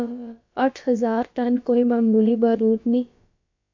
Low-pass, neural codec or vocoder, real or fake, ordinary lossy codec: 7.2 kHz; codec, 16 kHz, about 1 kbps, DyCAST, with the encoder's durations; fake; MP3, 64 kbps